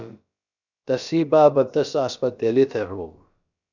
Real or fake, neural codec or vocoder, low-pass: fake; codec, 16 kHz, about 1 kbps, DyCAST, with the encoder's durations; 7.2 kHz